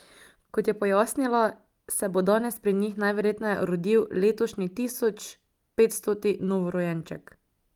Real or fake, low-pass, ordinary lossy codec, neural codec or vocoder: real; 19.8 kHz; Opus, 32 kbps; none